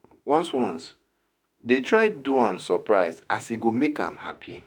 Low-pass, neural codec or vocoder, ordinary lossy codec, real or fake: 19.8 kHz; autoencoder, 48 kHz, 32 numbers a frame, DAC-VAE, trained on Japanese speech; none; fake